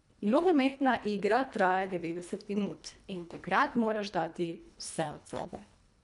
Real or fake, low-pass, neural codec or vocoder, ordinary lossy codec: fake; 10.8 kHz; codec, 24 kHz, 1.5 kbps, HILCodec; none